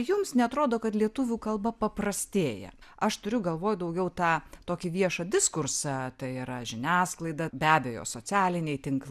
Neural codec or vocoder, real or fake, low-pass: none; real; 14.4 kHz